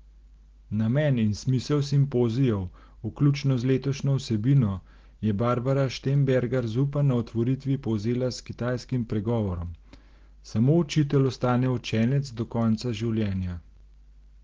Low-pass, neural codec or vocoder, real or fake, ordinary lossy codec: 7.2 kHz; none; real; Opus, 16 kbps